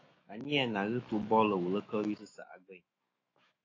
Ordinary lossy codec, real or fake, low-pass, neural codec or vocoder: AAC, 32 kbps; fake; 7.2 kHz; autoencoder, 48 kHz, 128 numbers a frame, DAC-VAE, trained on Japanese speech